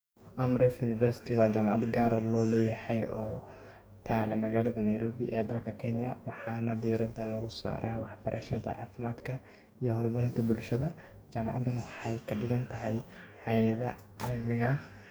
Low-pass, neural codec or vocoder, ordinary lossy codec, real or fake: none; codec, 44.1 kHz, 2.6 kbps, DAC; none; fake